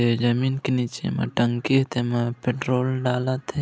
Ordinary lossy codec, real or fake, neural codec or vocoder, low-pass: none; real; none; none